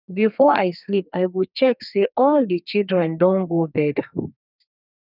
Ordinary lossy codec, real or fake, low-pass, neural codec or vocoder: none; fake; 5.4 kHz; codec, 32 kHz, 1.9 kbps, SNAC